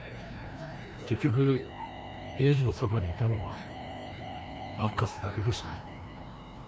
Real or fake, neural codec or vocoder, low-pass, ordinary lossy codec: fake; codec, 16 kHz, 1 kbps, FreqCodec, larger model; none; none